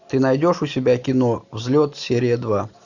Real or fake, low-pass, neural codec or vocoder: real; 7.2 kHz; none